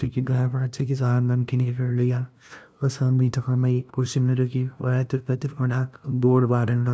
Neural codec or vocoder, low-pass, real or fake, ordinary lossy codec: codec, 16 kHz, 0.5 kbps, FunCodec, trained on LibriTTS, 25 frames a second; none; fake; none